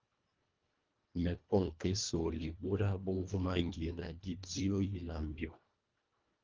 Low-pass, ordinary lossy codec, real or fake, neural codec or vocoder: 7.2 kHz; Opus, 24 kbps; fake; codec, 24 kHz, 1.5 kbps, HILCodec